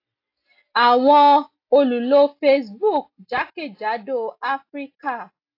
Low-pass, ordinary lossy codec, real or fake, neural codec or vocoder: 5.4 kHz; AAC, 32 kbps; real; none